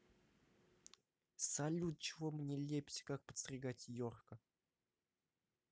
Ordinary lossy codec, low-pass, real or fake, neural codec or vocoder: none; none; real; none